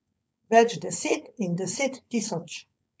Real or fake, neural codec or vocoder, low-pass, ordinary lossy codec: fake; codec, 16 kHz, 4.8 kbps, FACodec; none; none